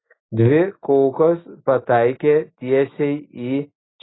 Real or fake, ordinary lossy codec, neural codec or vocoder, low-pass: real; AAC, 16 kbps; none; 7.2 kHz